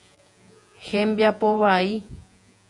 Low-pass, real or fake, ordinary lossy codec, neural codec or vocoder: 10.8 kHz; fake; MP3, 96 kbps; vocoder, 48 kHz, 128 mel bands, Vocos